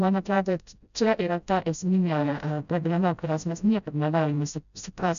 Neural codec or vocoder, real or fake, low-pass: codec, 16 kHz, 0.5 kbps, FreqCodec, smaller model; fake; 7.2 kHz